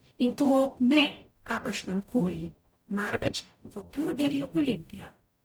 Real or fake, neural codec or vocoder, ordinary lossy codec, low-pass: fake; codec, 44.1 kHz, 0.9 kbps, DAC; none; none